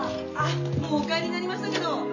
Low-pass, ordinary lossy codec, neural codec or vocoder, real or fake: 7.2 kHz; none; none; real